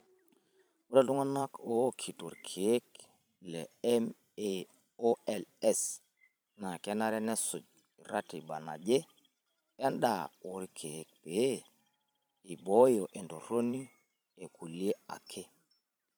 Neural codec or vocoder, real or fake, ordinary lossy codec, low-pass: vocoder, 44.1 kHz, 128 mel bands every 512 samples, BigVGAN v2; fake; none; none